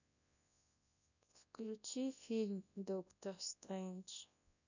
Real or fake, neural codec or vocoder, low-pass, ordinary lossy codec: fake; codec, 24 kHz, 0.9 kbps, WavTokenizer, large speech release; 7.2 kHz; none